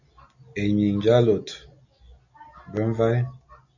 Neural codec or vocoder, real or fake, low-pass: none; real; 7.2 kHz